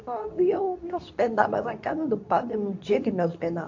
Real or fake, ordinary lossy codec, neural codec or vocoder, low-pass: fake; AAC, 48 kbps; codec, 24 kHz, 0.9 kbps, WavTokenizer, medium speech release version 2; 7.2 kHz